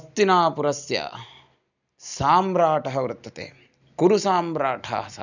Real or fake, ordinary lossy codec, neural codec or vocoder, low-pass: real; none; none; 7.2 kHz